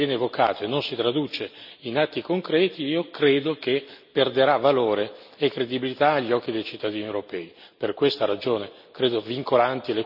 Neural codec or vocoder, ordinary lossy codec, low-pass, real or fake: none; none; 5.4 kHz; real